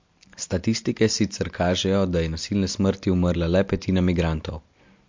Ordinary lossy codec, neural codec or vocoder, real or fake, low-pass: MP3, 48 kbps; none; real; 7.2 kHz